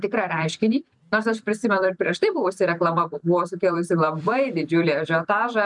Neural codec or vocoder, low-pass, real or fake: vocoder, 44.1 kHz, 128 mel bands every 256 samples, BigVGAN v2; 10.8 kHz; fake